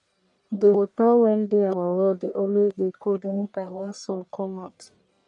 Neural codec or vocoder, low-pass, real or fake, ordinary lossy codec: codec, 44.1 kHz, 1.7 kbps, Pupu-Codec; 10.8 kHz; fake; none